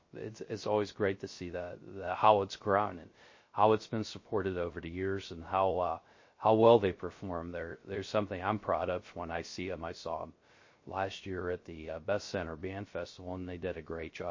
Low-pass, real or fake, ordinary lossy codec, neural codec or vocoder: 7.2 kHz; fake; MP3, 32 kbps; codec, 16 kHz, 0.3 kbps, FocalCodec